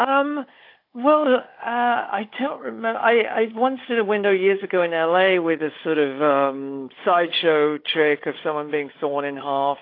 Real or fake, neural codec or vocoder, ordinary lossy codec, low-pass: fake; codec, 16 kHz, 16 kbps, FunCodec, trained on Chinese and English, 50 frames a second; AAC, 32 kbps; 5.4 kHz